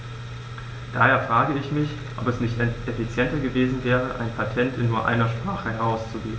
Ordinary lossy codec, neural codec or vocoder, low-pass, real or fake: none; none; none; real